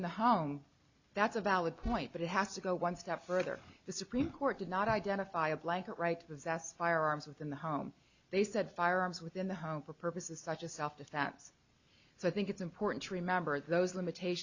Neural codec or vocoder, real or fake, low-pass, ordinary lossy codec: none; real; 7.2 kHz; Opus, 64 kbps